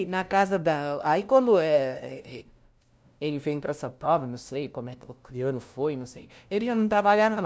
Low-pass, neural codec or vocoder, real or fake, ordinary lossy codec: none; codec, 16 kHz, 0.5 kbps, FunCodec, trained on LibriTTS, 25 frames a second; fake; none